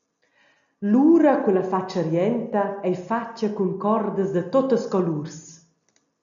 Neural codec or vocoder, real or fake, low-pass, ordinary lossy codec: none; real; 7.2 kHz; MP3, 96 kbps